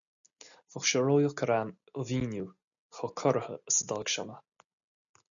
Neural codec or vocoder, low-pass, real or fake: none; 7.2 kHz; real